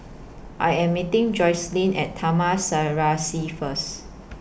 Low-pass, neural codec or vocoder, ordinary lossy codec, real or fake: none; none; none; real